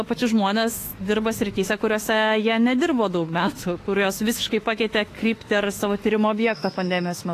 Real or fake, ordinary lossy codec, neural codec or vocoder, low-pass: fake; AAC, 48 kbps; autoencoder, 48 kHz, 32 numbers a frame, DAC-VAE, trained on Japanese speech; 14.4 kHz